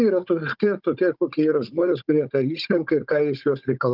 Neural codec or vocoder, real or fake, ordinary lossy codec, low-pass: codec, 16 kHz, 16 kbps, FunCodec, trained on Chinese and English, 50 frames a second; fake; Opus, 24 kbps; 5.4 kHz